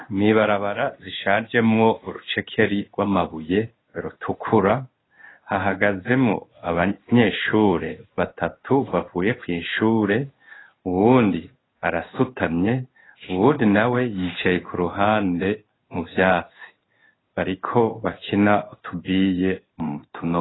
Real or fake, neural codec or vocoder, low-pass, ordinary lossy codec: fake; codec, 16 kHz in and 24 kHz out, 1 kbps, XY-Tokenizer; 7.2 kHz; AAC, 16 kbps